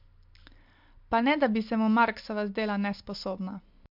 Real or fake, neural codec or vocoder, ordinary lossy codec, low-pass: real; none; MP3, 48 kbps; 5.4 kHz